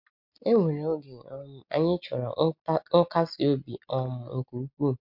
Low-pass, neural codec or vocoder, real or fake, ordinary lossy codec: 5.4 kHz; none; real; MP3, 48 kbps